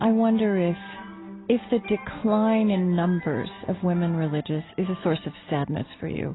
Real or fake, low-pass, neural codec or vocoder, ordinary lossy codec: real; 7.2 kHz; none; AAC, 16 kbps